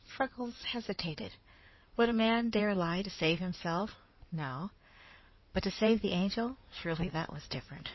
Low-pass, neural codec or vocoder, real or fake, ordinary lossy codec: 7.2 kHz; codec, 16 kHz in and 24 kHz out, 2.2 kbps, FireRedTTS-2 codec; fake; MP3, 24 kbps